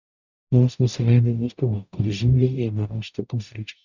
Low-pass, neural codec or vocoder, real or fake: 7.2 kHz; codec, 44.1 kHz, 0.9 kbps, DAC; fake